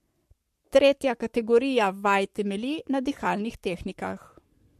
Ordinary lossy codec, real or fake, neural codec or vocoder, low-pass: MP3, 64 kbps; fake; codec, 44.1 kHz, 7.8 kbps, Pupu-Codec; 14.4 kHz